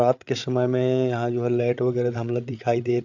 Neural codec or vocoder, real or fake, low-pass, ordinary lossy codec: codec, 16 kHz, 8 kbps, FreqCodec, larger model; fake; 7.2 kHz; none